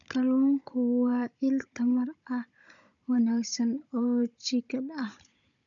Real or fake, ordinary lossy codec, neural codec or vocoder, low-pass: fake; none; codec, 16 kHz, 16 kbps, FunCodec, trained on Chinese and English, 50 frames a second; 7.2 kHz